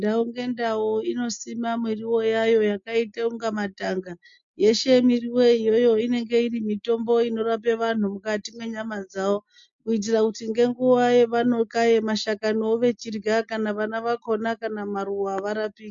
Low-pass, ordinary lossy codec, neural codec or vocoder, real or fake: 7.2 kHz; MP3, 48 kbps; none; real